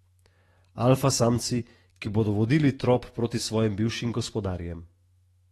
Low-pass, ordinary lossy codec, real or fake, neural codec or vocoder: 19.8 kHz; AAC, 32 kbps; fake; autoencoder, 48 kHz, 128 numbers a frame, DAC-VAE, trained on Japanese speech